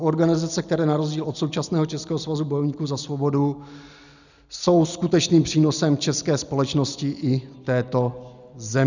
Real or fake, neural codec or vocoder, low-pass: real; none; 7.2 kHz